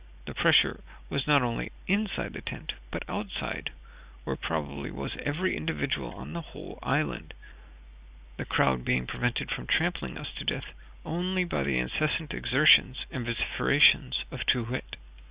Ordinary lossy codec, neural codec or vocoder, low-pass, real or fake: Opus, 64 kbps; none; 3.6 kHz; real